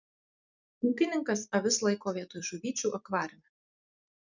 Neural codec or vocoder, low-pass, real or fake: vocoder, 44.1 kHz, 128 mel bands every 256 samples, BigVGAN v2; 7.2 kHz; fake